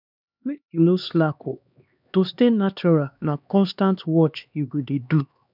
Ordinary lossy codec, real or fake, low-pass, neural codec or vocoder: none; fake; 5.4 kHz; codec, 16 kHz, 2 kbps, X-Codec, HuBERT features, trained on LibriSpeech